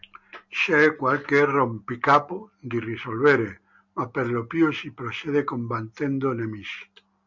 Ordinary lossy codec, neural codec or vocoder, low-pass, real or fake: MP3, 64 kbps; none; 7.2 kHz; real